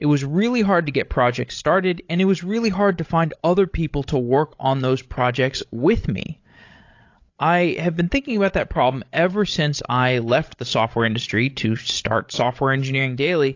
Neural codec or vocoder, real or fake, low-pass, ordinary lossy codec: codec, 16 kHz, 16 kbps, FreqCodec, larger model; fake; 7.2 kHz; AAC, 48 kbps